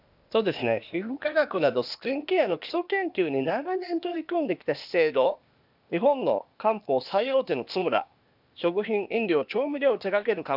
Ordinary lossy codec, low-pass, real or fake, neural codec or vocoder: none; 5.4 kHz; fake; codec, 16 kHz, 0.8 kbps, ZipCodec